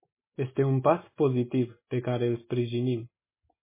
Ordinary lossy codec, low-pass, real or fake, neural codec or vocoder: MP3, 16 kbps; 3.6 kHz; real; none